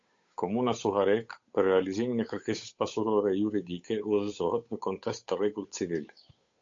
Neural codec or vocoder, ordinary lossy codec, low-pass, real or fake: codec, 16 kHz, 8 kbps, FunCodec, trained on Chinese and English, 25 frames a second; MP3, 48 kbps; 7.2 kHz; fake